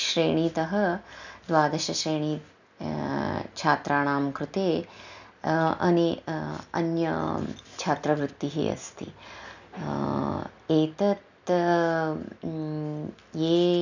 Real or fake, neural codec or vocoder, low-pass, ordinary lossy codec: real; none; 7.2 kHz; none